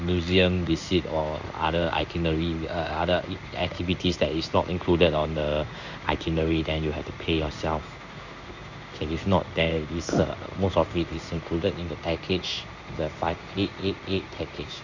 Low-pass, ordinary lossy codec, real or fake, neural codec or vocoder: 7.2 kHz; none; fake; codec, 16 kHz in and 24 kHz out, 1 kbps, XY-Tokenizer